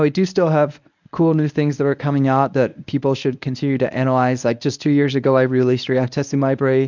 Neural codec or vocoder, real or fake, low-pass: codec, 24 kHz, 0.9 kbps, WavTokenizer, medium speech release version 1; fake; 7.2 kHz